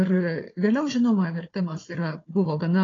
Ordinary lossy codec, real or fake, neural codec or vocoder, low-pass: AAC, 32 kbps; fake; codec, 16 kHz, 4 kbps, FunCodec, trained on Chinese and English, 50 frames a second; 7.2 kHz